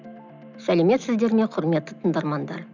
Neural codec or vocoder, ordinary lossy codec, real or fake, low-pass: none; none; real; 7.2 kHz